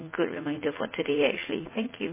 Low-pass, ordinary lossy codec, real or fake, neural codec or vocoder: 3.6 kHz; MP3, 16 kbps; fake; vocoder, 44.1 kHz, 80 mel bands, Vocos